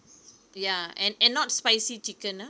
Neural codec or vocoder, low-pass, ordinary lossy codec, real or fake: none; none; none; real